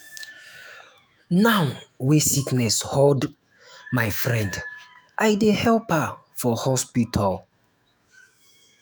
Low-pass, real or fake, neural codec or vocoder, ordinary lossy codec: none; fake; autoencoder, 48 kHz, 128 numbers a frame, DAC-VAE, trained on Japanese speech; none